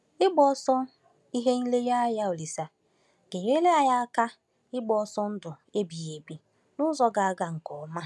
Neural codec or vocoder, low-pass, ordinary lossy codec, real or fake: none; none; none; real